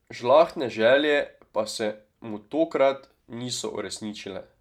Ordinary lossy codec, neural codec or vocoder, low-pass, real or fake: none; vocoder, 44.1 kHz, 128 mel bands every 512 samples, BigVGAN v2; 19.8 kHz; fake